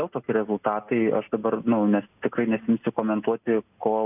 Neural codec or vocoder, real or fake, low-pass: none; real; 3.6 kHz